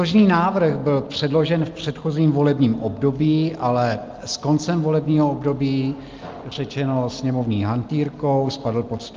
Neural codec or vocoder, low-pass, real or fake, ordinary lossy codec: none; 7.2 kHz; real; Opus, 32 kbps